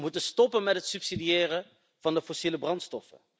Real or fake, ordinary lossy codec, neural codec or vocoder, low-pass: real; none; none; none